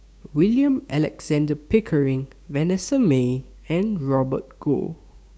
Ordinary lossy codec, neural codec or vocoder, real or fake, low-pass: none; codec, 16 kHz, 6 kbps, DAC; fake; none